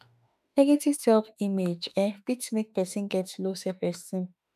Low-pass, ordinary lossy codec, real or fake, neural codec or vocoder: 14.4 kHz; none; fake; autoencoder, 48 kHz, 32 numbers a frame, DAC-VAE, trained on Japanese speech